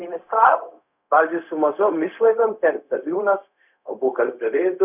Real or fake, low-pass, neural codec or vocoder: fake; 3.6 kHz; codec, 16 kHz, 0.4 kbps, LongCat-Audio-Codec